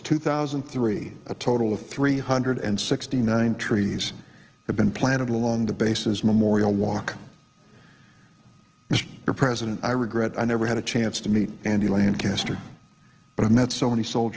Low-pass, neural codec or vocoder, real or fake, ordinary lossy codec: 7.2 kHz; none; real; Opus, 16 kbps